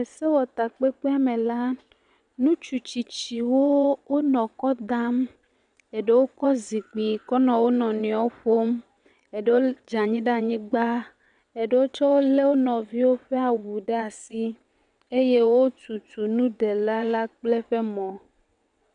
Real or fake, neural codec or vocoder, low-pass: fake; vocoder, 22.05 kHz, 80 mel bands, WaveNeXt; 9.9 kHz